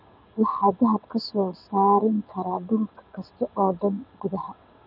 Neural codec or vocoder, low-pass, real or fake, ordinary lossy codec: vocoder, 22.05 kHz, 80 mel bands, WaveNeXt; 5.4 kHz; fake; none